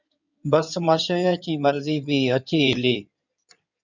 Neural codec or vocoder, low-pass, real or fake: codec, 16 kHz in and 24 kHz out, 2.2 kbps, FireRedTTS-2 codec; 7.2 kHz; fake